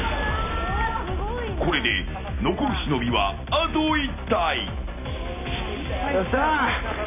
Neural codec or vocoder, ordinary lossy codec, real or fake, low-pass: none; none; real; 3.6 kHz